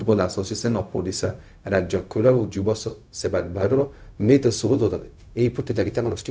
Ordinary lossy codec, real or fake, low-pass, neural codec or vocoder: none; fake; none; codec, 16 kHz, 0.4 kbps, LongCat-Audio-Codec